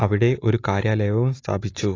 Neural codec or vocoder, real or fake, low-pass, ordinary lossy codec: none; real; 7.2 kHz; AAC, 32 kbps